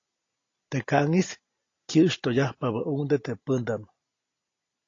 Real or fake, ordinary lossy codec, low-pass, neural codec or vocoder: real; AAC, 32 kbps; 7.2 kHz; none